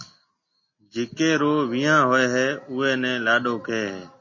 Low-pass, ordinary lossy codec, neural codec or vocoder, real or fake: 7.2 kHz; MP3, 32 kbps; none; real